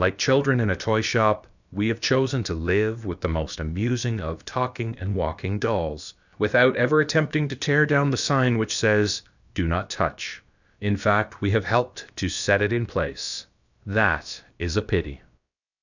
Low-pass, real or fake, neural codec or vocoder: 7.2 kHz; fake; codec, 16 kHz, about 1 kbps, DyCAST, with the encoder's durations